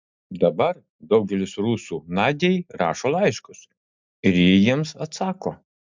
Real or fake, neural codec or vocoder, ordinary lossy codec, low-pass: real; none; MP3, 64 kbps; 7.2 kHz